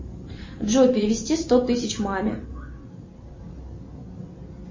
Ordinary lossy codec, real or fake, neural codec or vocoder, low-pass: MP3, 32 kbps; real; none; 7.2 kHz